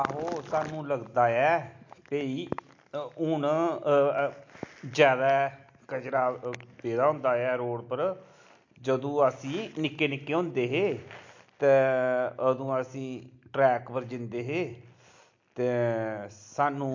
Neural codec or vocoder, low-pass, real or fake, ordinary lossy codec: none; 7.2 kHz; real; MP3, 48 kbps